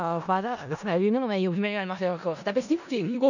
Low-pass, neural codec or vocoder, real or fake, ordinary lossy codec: 7.2 kHz; codec, 16 kHz in and 24 kHz out, 0.4 kbps, LongCat-Audio-Codec, four codebook decoder; fake; none